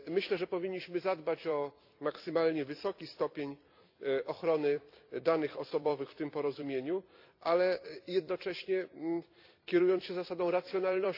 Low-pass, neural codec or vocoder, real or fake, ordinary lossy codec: 5.4 kHz; vocoder, 44.1 kHz, 128 mel bands every 256 samples, BigVGAN v2; fake; AAC, 48 kbps